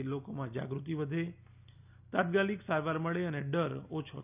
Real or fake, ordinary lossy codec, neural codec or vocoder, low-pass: real; none; none; 3.6 kHz